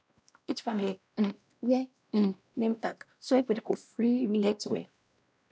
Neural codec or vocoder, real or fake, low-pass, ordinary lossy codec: codec, 16 kHz, 1 kbps, X-Codec, WavLM features, trained on Multilingual LibriSpeech; fake; none; none